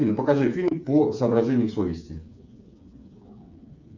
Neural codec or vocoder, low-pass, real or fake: codec, 16 kHz, 4 kbps, FreqCodec, smaller model; 7.2 kHz; fake